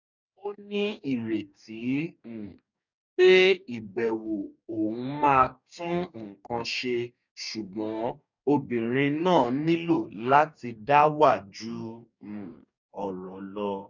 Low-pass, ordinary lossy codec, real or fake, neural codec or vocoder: 7.2 kHz; none; fake; codec, 44.1 kHz, 2.6 kbps, DAC